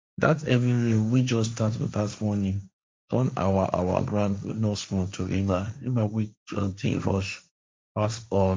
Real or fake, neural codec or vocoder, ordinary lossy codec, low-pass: fake; codec, 16 kHz, 1.1 kbps, Voila-Tokenizer; none; none